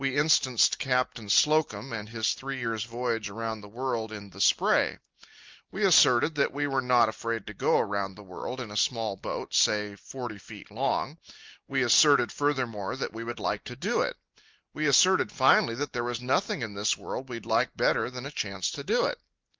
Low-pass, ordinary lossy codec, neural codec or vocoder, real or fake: 7.2 kHz; Opus, 24 kbps; none; real